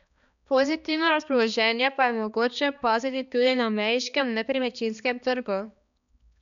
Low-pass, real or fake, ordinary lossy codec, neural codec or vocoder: 7.2 kHz; fake; none; codec, 16 kHz, 2 kbps, X-Codec, HuBERT features, trained on balanced general audio